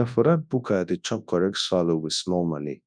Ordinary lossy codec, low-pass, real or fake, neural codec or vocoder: none; 9.9 kHz; fake; codec, 24 kHz, 0.9 kbps, WavTokenizer, large speech release